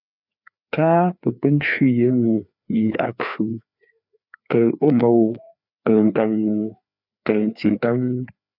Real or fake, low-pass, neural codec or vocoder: fake; 5.4 kHz; codec, 16 kHz, 2 kbps, FreqCodec, larger model